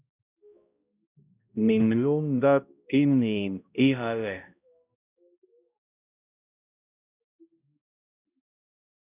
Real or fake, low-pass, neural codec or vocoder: fake; 3.6 kHz; codec, 16 kHz, 0.5 kbps, X-Codec, HuBERT features, trained on balanced general audio